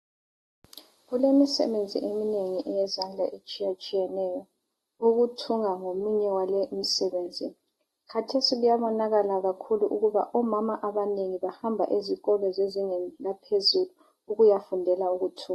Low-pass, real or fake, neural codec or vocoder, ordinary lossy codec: 19.8 kHz; real; none; AAC, 32 kbps